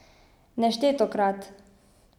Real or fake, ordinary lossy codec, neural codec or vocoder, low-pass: real; none; none; 19.8 kHz